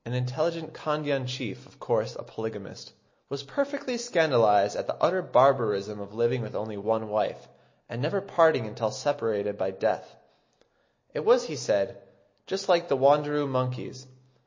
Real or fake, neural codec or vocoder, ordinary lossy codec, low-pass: real; none; MP3, 32 kbps; 7.2 kHz